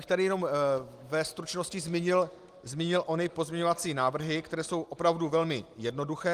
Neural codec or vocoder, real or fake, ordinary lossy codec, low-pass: none; real; Opus, 24 kbps; 14.4 kHz